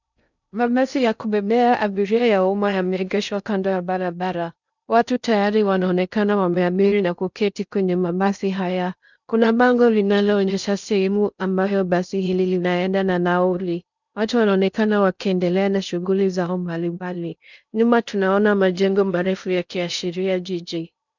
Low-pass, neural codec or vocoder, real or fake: 7.2 kHz; codec, 16 kHz in and 24 kHz out, 0.6 kbps, FocalCodec, streaming, 2048 codes; fake